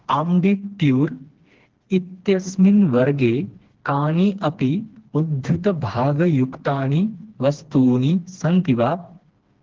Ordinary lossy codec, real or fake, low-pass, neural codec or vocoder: Opus, 16 kbps; fake; 7.2 kHz; codec, 16 kHz, 2 kbps, FreqCodec, smaller model